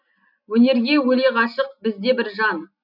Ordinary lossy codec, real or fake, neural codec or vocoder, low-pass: none; real; none; 5.4 kHz